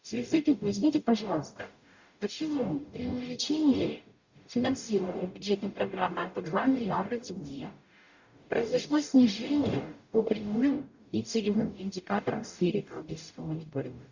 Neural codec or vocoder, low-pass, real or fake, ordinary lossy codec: codec, 44.1 kHz, 0.9 kbps, DAC; 7.2 kHz; fake; Opus, 64 kbps